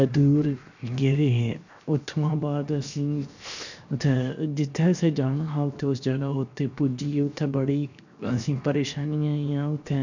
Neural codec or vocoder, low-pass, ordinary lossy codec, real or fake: codec, 16 kHz, 0.7 kbps, FocalCodec; 7.2 kHz; none; fake